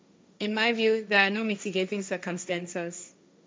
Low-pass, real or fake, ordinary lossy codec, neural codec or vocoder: none; fake; none; codec, 16 kHz, 1.1 kbps, Voila-Tokenizer